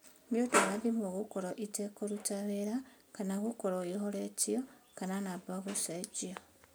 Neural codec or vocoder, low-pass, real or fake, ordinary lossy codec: none; none; real; none